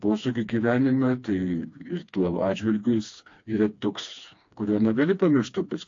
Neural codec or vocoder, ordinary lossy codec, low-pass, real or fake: codec, 16 kHz, 2 kbps, FreqCodec, smaller model; AAC, 48 kbps; 7.2 kHz; fake